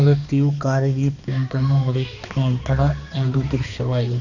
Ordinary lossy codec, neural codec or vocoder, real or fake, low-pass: none; codec, 16 kHz, 2 kbps, X-Codec, HuBERT features, trained on balanced general audio; fake; 7.2 kHz